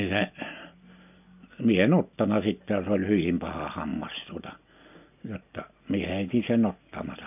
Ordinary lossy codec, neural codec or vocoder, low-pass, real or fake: none; none; 3.6 kHz; real